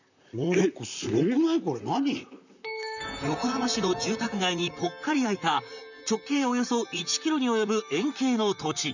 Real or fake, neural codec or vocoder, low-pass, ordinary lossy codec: fake; vocoder, 44.1 kHz, 128 mel bands, Pupu-Vocoder; 7.2 kHz; none